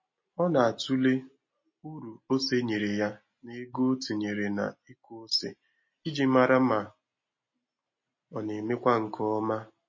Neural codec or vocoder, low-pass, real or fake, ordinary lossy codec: none; 7.2 kHz; real; MP3, 32 kbps